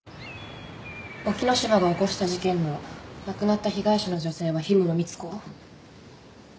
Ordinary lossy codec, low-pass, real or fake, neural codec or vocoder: none; none; real; none